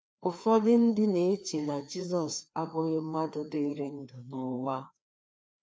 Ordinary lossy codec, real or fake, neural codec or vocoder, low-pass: none; fake; codec, 16 kHz, 2 kbps, FreqCodec, larger model; none